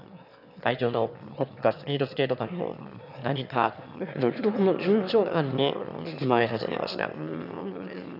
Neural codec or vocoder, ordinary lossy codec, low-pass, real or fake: autoencoder, 22.05 kHz, a latent of 192 numbers a frame, VITS, trained on one speaker; none; 5.4 kHz; fake